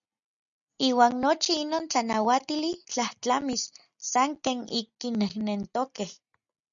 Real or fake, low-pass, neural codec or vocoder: real; 7.2 kHz; none